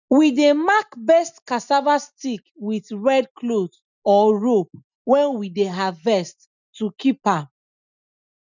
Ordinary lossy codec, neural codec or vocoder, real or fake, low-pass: none; none; real; 7.2 kHz